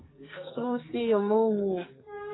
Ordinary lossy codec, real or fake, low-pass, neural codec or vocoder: AAC, 16 kbps; fake; 7.2 kHz; codec, 44.1 kHz, 2.6 kbps, SNAC